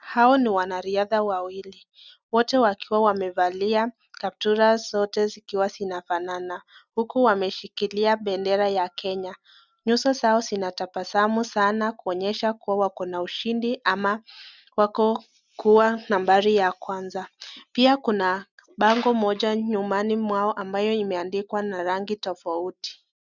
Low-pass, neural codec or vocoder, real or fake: 7.2 kHz; none; real